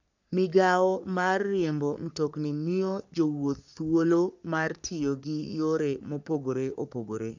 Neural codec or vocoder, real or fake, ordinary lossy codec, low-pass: codec, 44.1 kHz, 3.4 kbps, Pupu-Codec; fake; none; 7.2 kHz